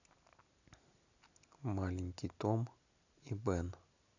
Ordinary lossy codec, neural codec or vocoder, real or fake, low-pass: none; none; real; 7.2 kHz